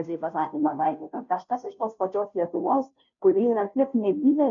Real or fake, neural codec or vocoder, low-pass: fake; codec, 16 kHz, 0.5 kbps, FunCodec, trained on Chinese and English, 25 frames a second; 7.2 kHz